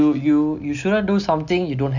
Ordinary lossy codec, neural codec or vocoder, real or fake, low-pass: none; none; real; 7.2 kHz